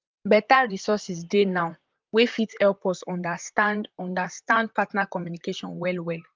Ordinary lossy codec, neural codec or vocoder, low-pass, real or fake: Opus, 24 kbps; vocoder, 44.1 kHz, 128 mel bands, Pupu-Vocoder; 7.2 kHz; fake